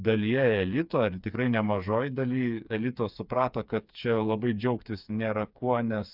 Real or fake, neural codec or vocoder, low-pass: fake; codec, 16 kHz, 4 kbps, FreqCodec, smaller model; 5.4 kHz